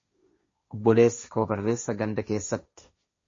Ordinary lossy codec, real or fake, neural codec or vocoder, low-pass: MP3, 32 kbps; fake; codec, 16 kHz, 1.1 kbps, Voila-Tokenizer; 7.2 kHz